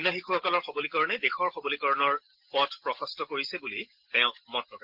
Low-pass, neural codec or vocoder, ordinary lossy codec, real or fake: 5.4 kHz; none; Opus, 16 kbps; real